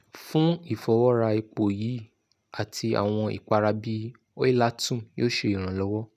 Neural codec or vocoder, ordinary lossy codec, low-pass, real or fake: none; none; 14.4 kHz; real